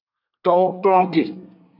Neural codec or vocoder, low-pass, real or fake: codec, 24 kHz, 1 kbps, SNAC; 5.4 kHz; fake